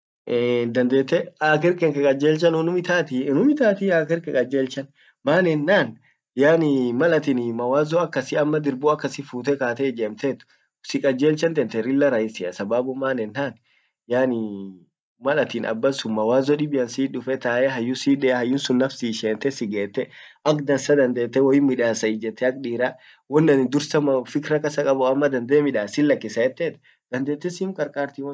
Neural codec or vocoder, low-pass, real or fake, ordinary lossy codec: none; none; real; none